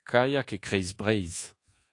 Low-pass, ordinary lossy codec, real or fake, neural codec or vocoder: 10.8 kHz; AAC, 48 kbps; fake; codec, 24 kHz, 1.2 kbps, DualCodec